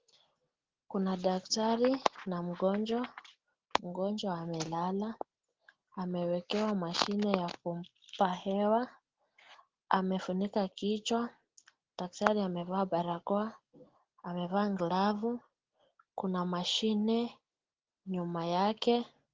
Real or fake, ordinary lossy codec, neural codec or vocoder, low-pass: real; Opus, 16 kbps; none; 7.2 kHz